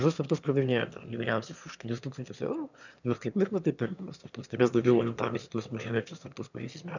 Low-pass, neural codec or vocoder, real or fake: 7.2 kHz; autoencoder, 22.05 kHz, a latent of 192 numbers a frame, VITS, trained on one speaker; fake